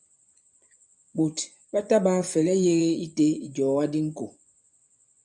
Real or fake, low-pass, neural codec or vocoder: fake; 10.8 kHz; vocoder, 24 kHz, 100 mel bands, Vocos